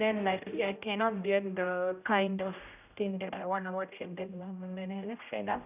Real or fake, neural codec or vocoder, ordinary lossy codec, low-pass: fake; codec, 16 kHz, 0.5 kbps, X-Codec, HuBERT features, trained on general audio; none; 3.6 kHz